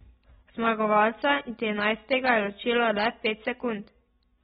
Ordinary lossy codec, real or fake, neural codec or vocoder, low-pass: AAC, 16 kbps; real; none; 7.2 kHz